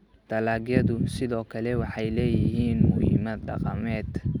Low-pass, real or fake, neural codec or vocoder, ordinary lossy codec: 19.8 kHz; real; none; none